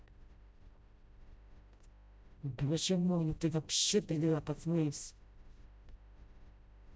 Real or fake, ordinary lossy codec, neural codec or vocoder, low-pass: fake; none; codec, 16 kHz, 0.5 kbps, FreqCodec, smaller model; none